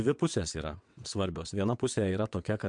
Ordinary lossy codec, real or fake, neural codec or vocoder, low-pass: MP3, 64 kbps; fake; vocoder, 22.05 kHz, 80 mel bands, WaveNeXt; 9.9 kHz